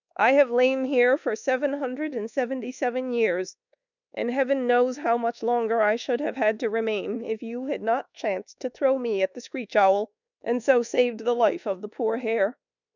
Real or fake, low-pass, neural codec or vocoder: fake; 7.2 kHz; codec, 24 kHz, 1.2 kbps, DualCodec